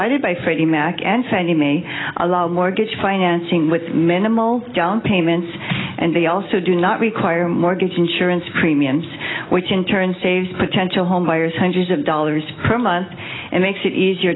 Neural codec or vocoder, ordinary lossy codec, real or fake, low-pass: none; AAC, 16 kbps; real; 7.2 kHz